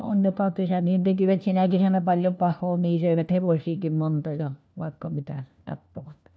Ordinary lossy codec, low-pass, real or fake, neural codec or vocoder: none; none; fake; codec, 16 kHz, 1 kbps, FunCodec, trained on LibriTTS, 50 frames a second